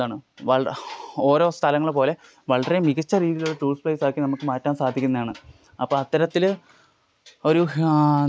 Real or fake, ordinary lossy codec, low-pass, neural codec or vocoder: real; none; none; none